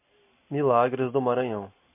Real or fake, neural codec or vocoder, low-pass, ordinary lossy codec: real; none; 3.6 kHz; MP3, 32 kbps